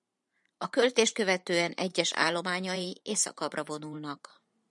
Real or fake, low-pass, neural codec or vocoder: fake; 10.8 kHz; vocoder, 44.1 kHz, 128 mel bands every 512 samples, BigVGAN v2